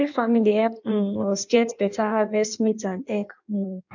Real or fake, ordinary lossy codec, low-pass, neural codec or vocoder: fake; none; 7.2 kHz; codec, 16 kHz in and 24 kHz out, 1.1 kbps, FireRedTTS-2 codec